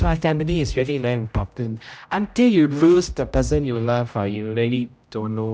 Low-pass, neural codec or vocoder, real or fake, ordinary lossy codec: none; codec, 16 kHz, 0.5 kbps, X-Codec, HuBERT features, trained on general audio; fake; none